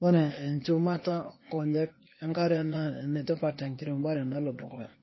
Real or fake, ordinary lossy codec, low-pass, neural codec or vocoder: fake; MP3, 24 kbps; 7.2 kHz; codec, 16 kHz, 0.8 kbps, ZipCodec